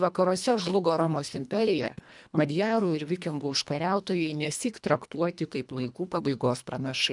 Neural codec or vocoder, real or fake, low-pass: codec, 24 kHz, 1.5 kbps, HILCodec; fake; 10.8 kHz